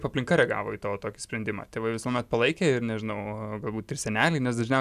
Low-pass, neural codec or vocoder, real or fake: 14.4 kHz; none; real